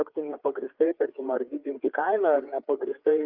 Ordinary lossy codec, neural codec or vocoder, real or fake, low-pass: Opus, 32 kbps; codec, 16 kHz, 4 kbps, FreqCodec, larger model; fake; 5.4 kHz